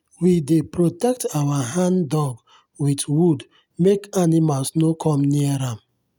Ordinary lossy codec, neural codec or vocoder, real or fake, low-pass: none; none; real; none